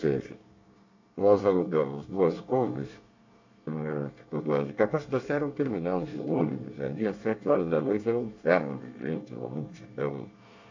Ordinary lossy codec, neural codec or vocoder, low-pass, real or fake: AAC, 48 kbps; codec, 24 kHz, 1 kbps, SNAC; 7.2 kHz; fake